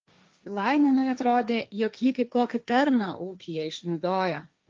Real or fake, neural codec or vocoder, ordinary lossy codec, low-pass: fake; codec, 16 kHz, 1.1 kbps, Voila-Tokenizer; Opus, 24 kbps; 7.2 kHz